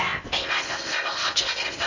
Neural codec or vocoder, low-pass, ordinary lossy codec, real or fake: codec, 16 kHz in and 24 kHz out, 0.6 kbps, FocalCodec, streaming, 2048 codes; 7.2 kHz; Opus, 64 kbps; fake